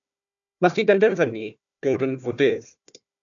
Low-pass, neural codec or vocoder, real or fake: 7.2 kHz; codec, 16 kHz, 1 kbps, FunCodec, trained on Chinese and English, 50 frames a second; fake